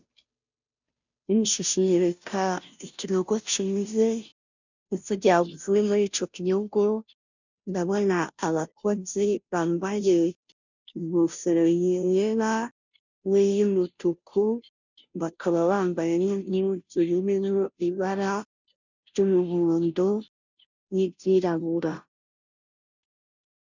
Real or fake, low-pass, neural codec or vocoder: fake; 7.2 kHz; codec, 16 kHz, 0.5 kbps, FunCodec, trained on Chinese and English, 25 frames a second